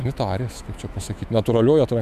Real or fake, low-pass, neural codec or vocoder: fake; 14.4 kHz; autoencoder, 48 kHz, 128 numbers a frame, DAC-VAE, trained on Japanese speech